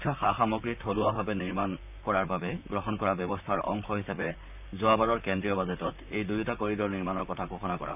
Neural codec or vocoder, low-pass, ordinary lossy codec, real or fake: vocoder, 44.1 kHz, 128 mel bands, Pupu-Vocoder; 3.6 kHz; none; fake